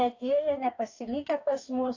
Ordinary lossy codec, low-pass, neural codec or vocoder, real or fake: AAC, 48 kbps; 7.2 kHz; codec, 44.1 kHz, 2.6 kbps, DAC; fake